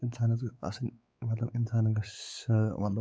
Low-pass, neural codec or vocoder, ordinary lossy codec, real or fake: none; codec, 16 kHz, 4 kbps, X-Codec, WavLM features, trained on Multilingual LibriSpeech; none; fake